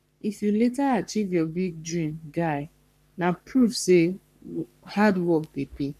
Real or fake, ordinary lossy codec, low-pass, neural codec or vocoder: fake; none; 14.4 kHz; codec, 44.1 kHz, 3.4 kbps, Pupu-Codec